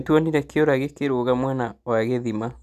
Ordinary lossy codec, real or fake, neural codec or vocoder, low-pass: none; real; none; 14.4 kHz